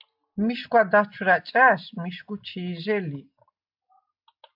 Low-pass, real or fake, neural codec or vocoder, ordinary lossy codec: 5.4 kHz; real; none; AAC, 48 kbps